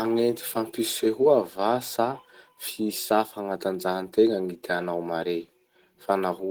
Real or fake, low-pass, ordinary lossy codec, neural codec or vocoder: real; 19.8 kHz; Opus, 16 kbps; none